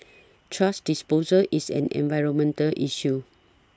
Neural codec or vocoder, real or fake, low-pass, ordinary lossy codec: none; real; none; none